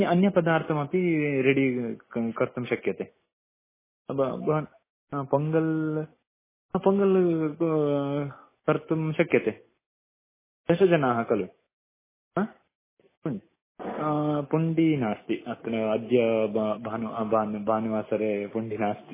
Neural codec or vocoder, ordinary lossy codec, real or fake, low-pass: none; MP3, 16 kbps; real; 3.6 kHz